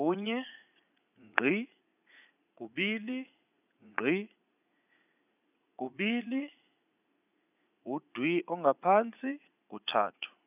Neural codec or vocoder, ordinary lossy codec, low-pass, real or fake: vocoder, 44.1 kHz, 80 mel bands, Vocos; none; 3.6 kHz; fake